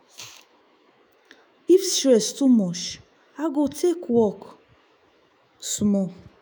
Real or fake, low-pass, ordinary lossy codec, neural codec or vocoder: fake; none; none; autoencoder, 48 kHz, 128 numbers a frame, DAC-VAE, trained on Japanese speech